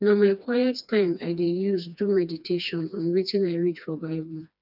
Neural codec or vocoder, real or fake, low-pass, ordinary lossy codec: codec, 16 kHz, 2 kbps, FreqCodec, smaller model; fake; 5.4 kHz; none